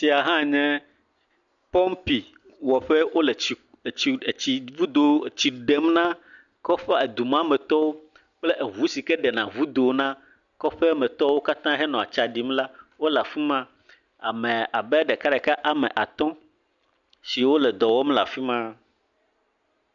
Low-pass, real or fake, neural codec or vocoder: 7.2 kHz; real; none